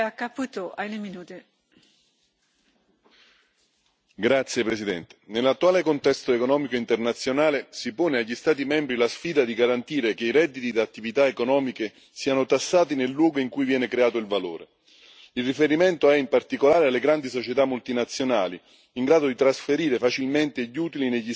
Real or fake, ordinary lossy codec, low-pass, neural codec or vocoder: real; none; none; none